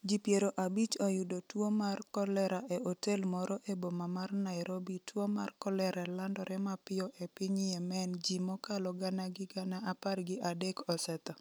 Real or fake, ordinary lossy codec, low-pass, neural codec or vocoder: real; none; none; none